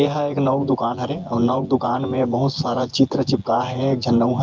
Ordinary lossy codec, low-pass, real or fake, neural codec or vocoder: Opus, 32 kbps; 7.2 kHz; fake; vocoder, 24 kHz, 100 mel bands, Vocos